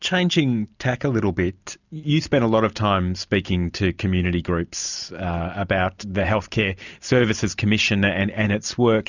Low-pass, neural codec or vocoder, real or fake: 7.2 kHz; none; real